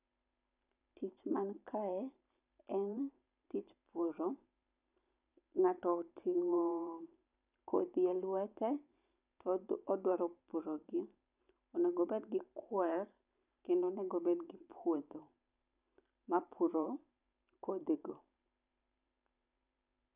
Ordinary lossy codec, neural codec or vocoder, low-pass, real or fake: none; vocoder, 44.1 kHz, 128 mel bands every 512 samples, BigVGAN v2; 3.6 kHz; fake